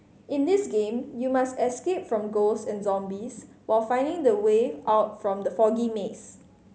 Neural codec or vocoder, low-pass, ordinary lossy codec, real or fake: none; none; none; real